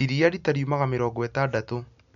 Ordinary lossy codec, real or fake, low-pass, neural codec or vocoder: none; real; 7.2 kHz; none